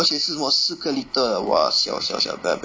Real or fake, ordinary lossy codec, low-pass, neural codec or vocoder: real; none; none; none